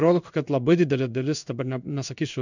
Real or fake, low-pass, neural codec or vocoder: fake; 7.2 kHz; codec, 16 kHz in and 24 kHz out, 1 kbps, XY-Tokenizer